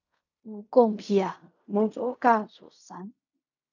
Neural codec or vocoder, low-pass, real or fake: codec, 16 kHz in and 24 kHz out, 0.4 kbps, LongCat-Audio-Codec, fine tuned four codebook decoder; 7.2 kHz; fake